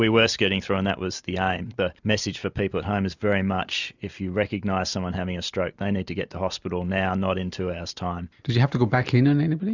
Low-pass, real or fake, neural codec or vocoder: 7.2 kHz; real; none